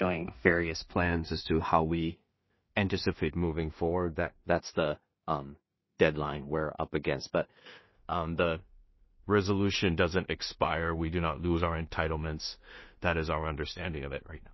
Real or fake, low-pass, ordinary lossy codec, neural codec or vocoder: fake; 7.2 kHz; MP3, 24 kbps; codec, 16 kHz in and 24 kHz out, 0.4 kbps, LongCat-Audio-Codec, two codebook decoder